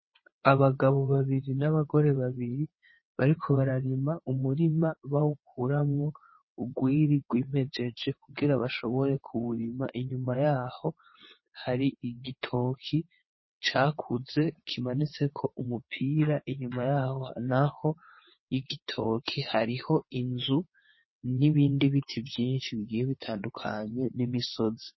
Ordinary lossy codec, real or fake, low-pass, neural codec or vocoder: MP3, 24 kbps; fake; 7.2 kHz; vocoder, 22.05 kHz, 80 mel bands, WaveNeXt